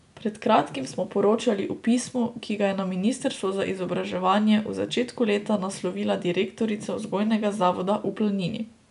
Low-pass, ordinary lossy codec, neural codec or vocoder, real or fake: 10.8 kHz; none; none; real